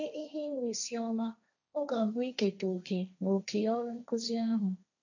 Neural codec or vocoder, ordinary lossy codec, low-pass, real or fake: codec, 16 kHz, 1.1 kbps, Voila-Tokenizer; none; 7.2 kHz; fake